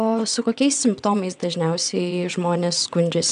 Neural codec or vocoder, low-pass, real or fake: vocoder, 22.05 kHz, 80 mel bands, WaveNeXt; 9.9 kHz; fake